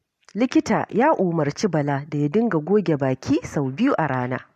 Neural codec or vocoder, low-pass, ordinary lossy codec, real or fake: none; 14.4 kHz; MP3, 64 kbps; real